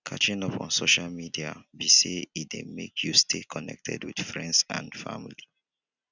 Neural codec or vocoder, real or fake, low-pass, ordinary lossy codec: none; real; 7.2 kHz; none